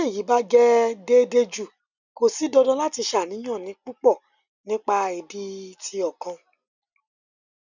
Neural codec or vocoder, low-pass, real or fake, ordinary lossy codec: none; 7.2 kHz; real; none